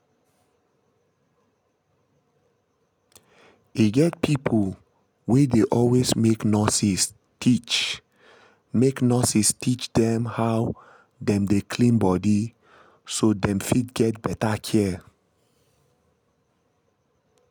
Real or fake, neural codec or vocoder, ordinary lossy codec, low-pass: real; none; none; none